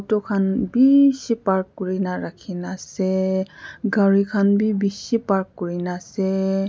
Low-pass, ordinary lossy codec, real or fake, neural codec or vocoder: none; none; real; none